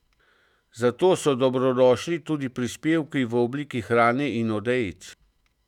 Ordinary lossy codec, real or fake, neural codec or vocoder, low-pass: none; fake; autoencoder, 48 kHz, 128 numbers a frame, DAC-VAE, trained on Japanese speech; 19.8 kHz